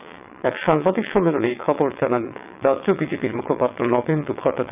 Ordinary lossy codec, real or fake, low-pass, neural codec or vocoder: none; fake; 3.6 kHz; vocoder, 22.05 kHz, 80 mel bands, WaveNeXt